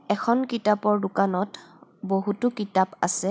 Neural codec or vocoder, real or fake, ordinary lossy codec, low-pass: none; real; none; none